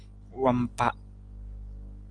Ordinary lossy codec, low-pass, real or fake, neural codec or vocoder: Opus, 32 kbps; 9.9 kHz; real; none